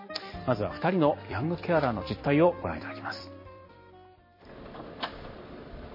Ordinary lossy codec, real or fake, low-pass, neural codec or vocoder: MP3, 24 kbps; fake; 5.4 kHz; vocoder, 44.1 kHz, 128 mel bands every 256 samples, BigVGAN v2